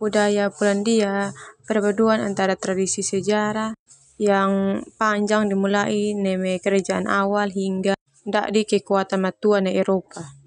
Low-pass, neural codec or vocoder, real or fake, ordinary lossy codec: 9.9 kHz; none; real; none